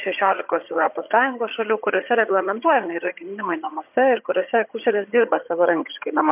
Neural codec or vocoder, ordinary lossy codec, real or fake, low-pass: vocoder, 22.05 kHz, 80 mel bands, HiFi-GAN; MP3, 32 kbps; fake; 3.6 kHz